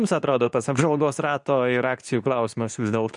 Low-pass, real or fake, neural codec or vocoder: 10.8 kHz; fake; codec, 24 kHz, 0.9 kbps, WavTokenizer, medium speech release version 2